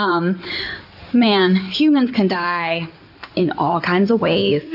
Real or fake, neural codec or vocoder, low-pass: fake; codec, 16 kHz in and 24 kHz out, 2.2 kbps, FireRedTTS-2 codec; 5.4 kHz